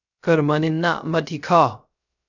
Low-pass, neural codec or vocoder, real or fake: 7.2 kHz; codec, 16 kHz, 0.3 kbps, FocalCodec; fake